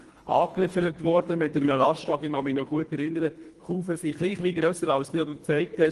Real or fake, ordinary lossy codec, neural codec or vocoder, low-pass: fake; Opus, 24 kbps; codec, 24 kHz, 1.5 kbps, HILCodec; 10.8 kHz